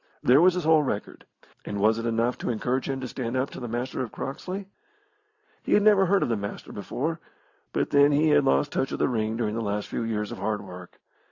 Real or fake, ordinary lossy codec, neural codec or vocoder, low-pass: real; AAC, 48 kbps; none; 7.2 kHz